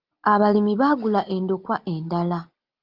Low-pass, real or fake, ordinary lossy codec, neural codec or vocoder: 5.4 kHz; real; Opus, 32 kbps; none